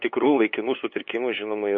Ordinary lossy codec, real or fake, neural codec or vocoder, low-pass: MP3, 32 kbps; fake; codec, 16 kHz, 8 kbps, FunCodec, trained on LibriTTS, 25 frames a second; 7.2 kHz